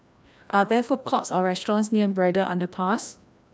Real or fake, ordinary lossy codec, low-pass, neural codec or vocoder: fake; none; none; codec, 16 kHz, 1 kbps, FreqCodec, larger model